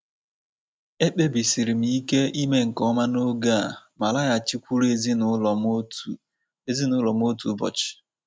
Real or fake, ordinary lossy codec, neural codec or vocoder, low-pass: real; none; none; none